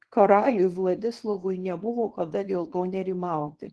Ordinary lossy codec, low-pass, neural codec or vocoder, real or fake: Opus, 16 kbps; 10.8 kHz; codec, 24 kHz, 0.9 kbps, WavTokenizer, small release; fake